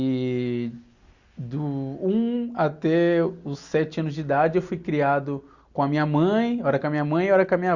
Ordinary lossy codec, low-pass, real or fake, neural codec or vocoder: Opus, 64 kbps; 7.2 kHz; real; none